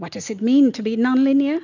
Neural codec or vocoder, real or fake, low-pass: none; real; 7.2 kHz